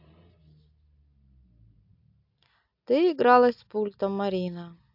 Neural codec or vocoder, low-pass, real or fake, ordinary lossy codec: none; 5.4 kHz; real; AAC, 48 kbps